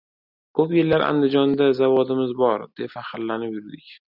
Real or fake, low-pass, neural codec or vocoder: real; 5.4 kHz; none